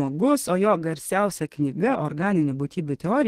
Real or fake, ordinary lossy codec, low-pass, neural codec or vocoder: fake; Opus, 16 kbps; 14.4 kHz; codec, 32 kHz, 1.9 kbps, SNAC